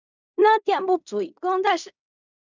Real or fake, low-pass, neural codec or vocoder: fake; 7.2 kHz; codec, 16 kHz in and 24 kHz out, 0.4 kbps, LongCat-Audio-Codec, fine tuned four codebook decoder